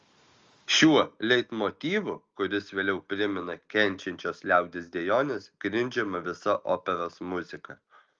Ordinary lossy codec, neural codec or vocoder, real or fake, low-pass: Opus, 32 kbps; none; real; 7.2 kHz